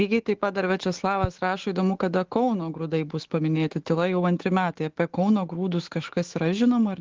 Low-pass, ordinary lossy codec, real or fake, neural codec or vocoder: 7.2 kHz; Opus, 16 kbps; fake; autoencoder, 48 kHz, 128 numbers a frame, DAC-VAE, trained on Japanese speech